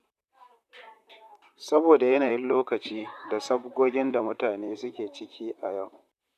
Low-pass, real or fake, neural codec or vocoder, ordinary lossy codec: 14.4 kHz; fake; vocoder, 44.1 kHz, 128 mel bands, Pupu-Vocoder; none